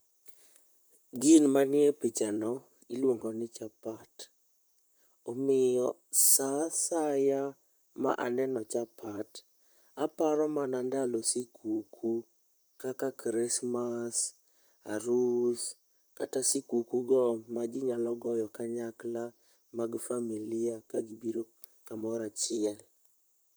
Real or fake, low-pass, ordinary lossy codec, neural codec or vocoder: fake; none; none; vocoder, 44.1 kHz, 128 mel bands, Pupu-Vocoder